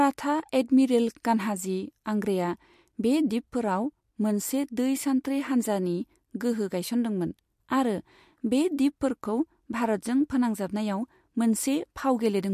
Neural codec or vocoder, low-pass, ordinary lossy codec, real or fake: none; 14.4 kHz; MP3, 64 kbps; real